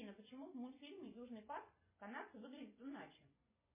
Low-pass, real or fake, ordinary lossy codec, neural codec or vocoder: 3.6 kHz; fake; MP3, 16 kbps; vocoder, 24 kHz, 100 mel bands, Vocos